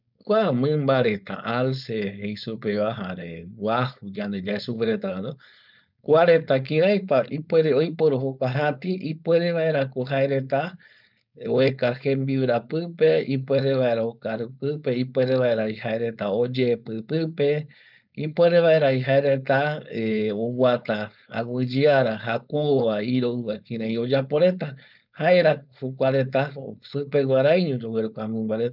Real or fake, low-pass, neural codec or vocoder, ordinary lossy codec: fake; 5.4 kHz; codec, 16 kHz, 4.8 kbps, FACodec; none